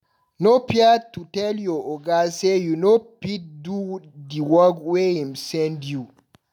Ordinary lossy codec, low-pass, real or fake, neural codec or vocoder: none; 19.8 kHz; real; none